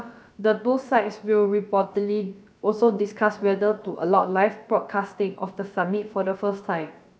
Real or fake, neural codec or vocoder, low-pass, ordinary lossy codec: fake; codec, 16 kHz, about 1 kbps, DyCAST, with the encoder's durations; none; none